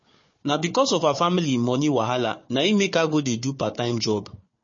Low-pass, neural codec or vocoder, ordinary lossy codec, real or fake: 7.2 kHz; codec, 16 kHz, 4 kbps, FunCodec, trained on Chinese and English, 50 frames a second; MP3, 32 kbps; fake